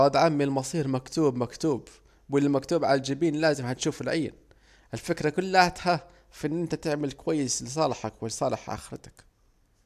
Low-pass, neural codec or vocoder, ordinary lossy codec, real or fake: 14.4 kHz; none; none; real